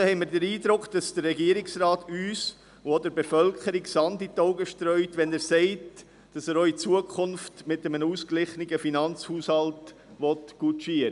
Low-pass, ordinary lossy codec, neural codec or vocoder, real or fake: 10.8 kHz; none; none; real